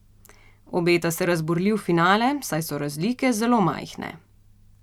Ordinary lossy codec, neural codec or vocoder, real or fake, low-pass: none; none; real; 19.8 kHz